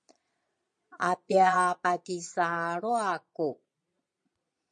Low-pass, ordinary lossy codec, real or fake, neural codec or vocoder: 9.9 kHz; MP3, 48 kbps; fake; vocoder, 22.05 kHz, 80 mel bands, Vocos